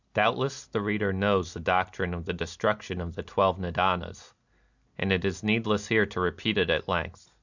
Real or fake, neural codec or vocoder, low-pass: real; none; 7.2 kHz